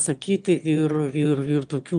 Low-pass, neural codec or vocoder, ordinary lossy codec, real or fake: 9.9 kHz; autoencoder, 22.05 kHz, a latent of 192 numbers a frame, VITS, trained on one speaker; Opus, 32 kbps; fake